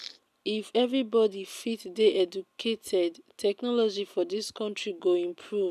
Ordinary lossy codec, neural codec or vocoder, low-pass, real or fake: none; none; 14.4 kHz; real